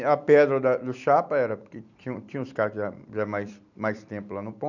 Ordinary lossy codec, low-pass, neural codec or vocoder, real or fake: none; 7.2 kHz; vocoder, 44.1 kHz, 128 mel bands every 256 samples, BigVGAN v2; fake